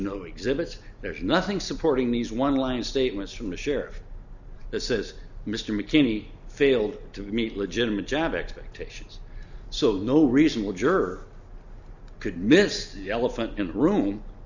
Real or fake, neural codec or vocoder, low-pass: real; none; 7.2 kHz